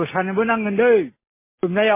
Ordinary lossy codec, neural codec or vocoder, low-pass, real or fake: MP3, 16 kbps; none; 3.6 kHz; real